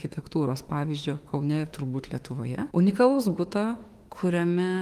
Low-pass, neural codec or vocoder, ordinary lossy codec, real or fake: 14.4 kHz; autoencoder, 48 kHz, 32 numbers a frame, DAC-VAE, trained on Japanese speech; Opus, 24 kbps; fake